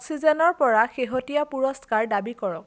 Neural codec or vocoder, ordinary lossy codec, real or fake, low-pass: none; none; real; none